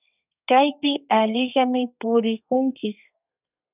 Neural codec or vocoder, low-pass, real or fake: codec, 32 kHz, 1.9 kbps, SNAC; 3.6 kHz; fake